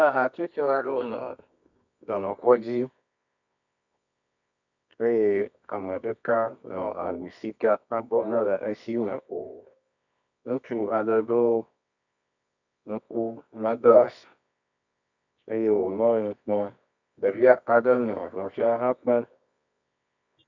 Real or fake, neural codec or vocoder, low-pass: fake; codec, 24 kHz, 0.9 kbps, WavTokenizer, medium music audio release; 7.2 kHz